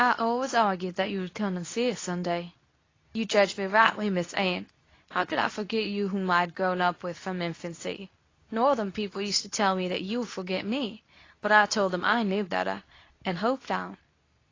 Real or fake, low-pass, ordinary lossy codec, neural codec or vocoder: fake; 7.2 kHz; AAC, 32 kbps; codec, 24 kHz, 0.9 kbps, WavTokenizer, medium speech release version 2